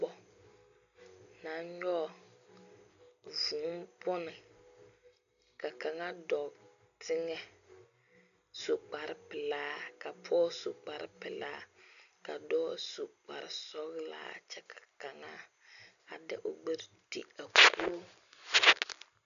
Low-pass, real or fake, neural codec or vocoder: 7.2 kHz; real; none